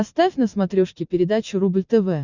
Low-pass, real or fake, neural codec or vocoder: 7.2 kHz; real; none